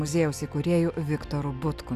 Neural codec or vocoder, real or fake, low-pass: none; real; 14.4 kHz